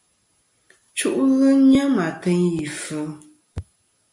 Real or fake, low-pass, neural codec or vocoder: real; 10.8 kHz; none